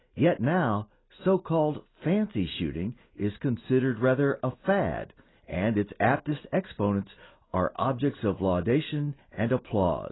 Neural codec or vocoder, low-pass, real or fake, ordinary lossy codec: none; 7.2 kHz; real; AAC, 16 kbps